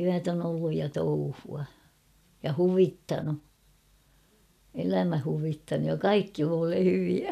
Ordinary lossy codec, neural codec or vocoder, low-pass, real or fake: none; none; 14.4 kHz; real